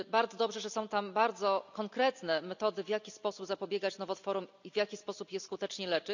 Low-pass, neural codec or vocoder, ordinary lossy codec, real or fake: 7.2 kHz; none; none; real